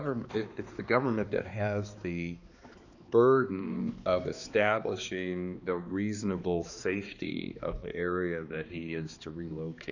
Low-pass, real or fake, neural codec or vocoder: 7.2 kHz; fake; codec, 16 kHz, 2 kbps, X-Codec, HuBERT features, trained on balanced general audio